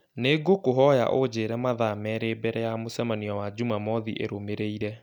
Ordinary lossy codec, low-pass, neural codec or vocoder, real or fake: none; 19.8 kHz; none; real